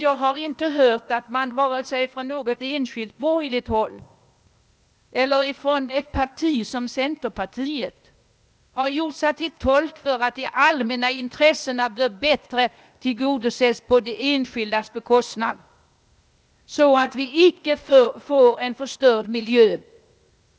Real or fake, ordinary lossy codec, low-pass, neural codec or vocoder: fake; none; none; codec, 16 kHz, 0.8 kbps, ZipCodec